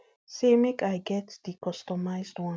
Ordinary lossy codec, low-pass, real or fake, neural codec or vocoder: none; none; real; none